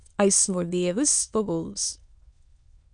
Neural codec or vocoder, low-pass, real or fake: autoencoder, 22.05 kHz, a latent of 192 numbers a frame, VITS, trained on many speakers; 9.9 kHz; fake